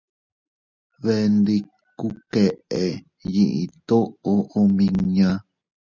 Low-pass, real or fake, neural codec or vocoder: 7.2 kHz; real; none